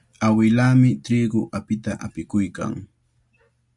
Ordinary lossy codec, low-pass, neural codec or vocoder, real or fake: MP3, 96 kbps; 10.8 kHz; none; real